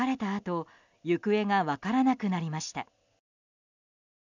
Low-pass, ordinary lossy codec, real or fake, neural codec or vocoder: 7.2 kHz; none; real; none